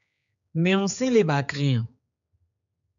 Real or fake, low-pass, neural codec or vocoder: fake; 7.2 kHz; codec, 16 kHz, 4 kbps, X-Codec, HuBERT features, trained on general audio